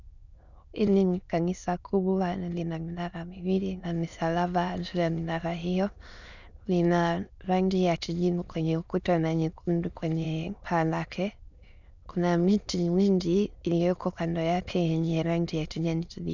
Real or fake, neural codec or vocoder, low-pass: fake; autoencoder, 22.05 kHz, a latent of 192 numbers a frame, VITS, trained on many speakers; 7.2 kHz